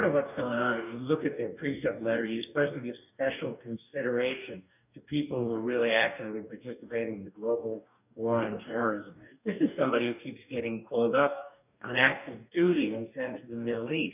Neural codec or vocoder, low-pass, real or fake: codec, 44.1 kHz, 2.6 kbps, DAC; 3.6 kHz; fake